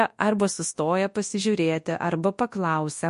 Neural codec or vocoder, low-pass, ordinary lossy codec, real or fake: codec, 24 kHz, 0.9 kbps, WavTokenizer, large speech release; 10.8 kHz; MP3, 48 kbps; fake